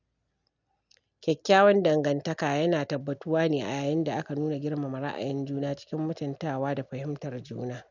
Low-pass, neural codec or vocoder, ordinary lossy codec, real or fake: 7.2 kHz; none; none; real